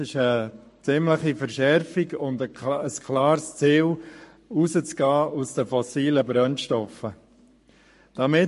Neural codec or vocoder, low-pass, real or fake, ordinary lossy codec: codec, 44.1 kHz, 7.8 kbps, Pupu-Codec; 14.4 kHz; fake; MP3, 48 kbps